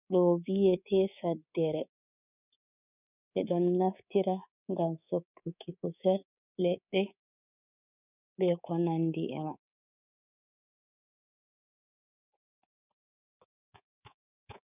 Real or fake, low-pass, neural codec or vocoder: fake; 3.6 kHz; codec, 24 kHz, 3.1 kbps, DualCodec